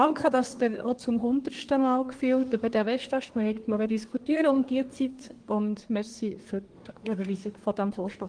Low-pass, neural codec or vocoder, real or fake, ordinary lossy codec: 9.9 kHz; codec, 24 kHz, 1 kbps, SNAC; fake; Opus, 24 kbps